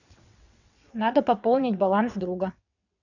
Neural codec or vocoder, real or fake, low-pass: vocoder, 22.05 kHz, 80 mel bands, WaveNeXt; fake; 7.2 kHz